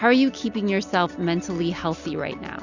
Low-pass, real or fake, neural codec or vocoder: 7.2 kHz; real; none